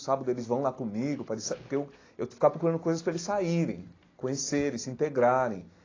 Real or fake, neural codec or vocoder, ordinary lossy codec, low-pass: real; none; AAC, 32 kbps; 7.2 kHz